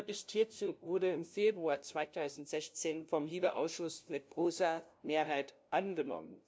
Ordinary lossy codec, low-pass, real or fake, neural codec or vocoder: none; none; fake; codec, 16 kHz, 0.5 kbps, FunCodec, trained on LibriTTS, 25 frames a second